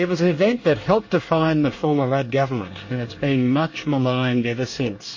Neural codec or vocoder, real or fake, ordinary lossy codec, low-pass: codec, 24 kHz, 1 kbps, SNAC; fake; MP3, 32 kbps; 7.2 kHz